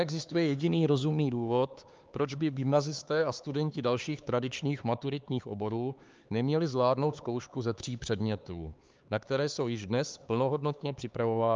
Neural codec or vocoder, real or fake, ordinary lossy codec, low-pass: codec, 16 kHz, 4 kbps, X-Codec, HuBERT features, trained on LibriSpeech; fake; Opus, 24 kbps; 7.2 kHz